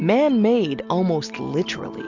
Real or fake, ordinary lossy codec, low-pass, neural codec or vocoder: real; MP3, 64 kbps; 7.2 kHz; none